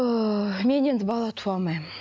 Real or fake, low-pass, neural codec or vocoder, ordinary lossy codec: real; none; none; none